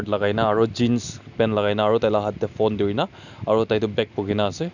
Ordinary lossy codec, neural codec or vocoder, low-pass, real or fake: none; none; 7.2 kHz; real